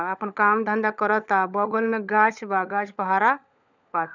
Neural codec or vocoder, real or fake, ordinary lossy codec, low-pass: codec, 16 kHz, 16 kbps, FunCodec, trained on LibriTTS, 50 frames a second; fake; none; 7.2 kHz